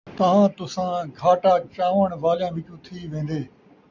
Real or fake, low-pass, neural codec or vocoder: real; 7.2 kHz; none